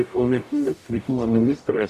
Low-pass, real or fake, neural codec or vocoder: 14.4 kHz; fake; codec, 44.1 kHz, 0.9 kbps, DAC